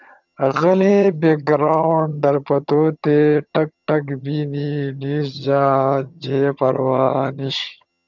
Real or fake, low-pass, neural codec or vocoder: fake; 7.2 kHz; vocoder, 22.05 kHz, 80 mel bands, HiFi-GAN